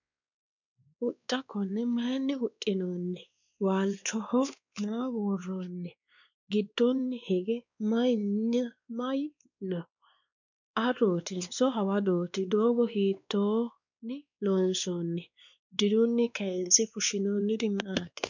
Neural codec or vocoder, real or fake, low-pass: codec, 16 kHz, 2 kbps, X-Codec, WavLM features, trained on Multilingual LibriSpeech; fake; 7.2 kHz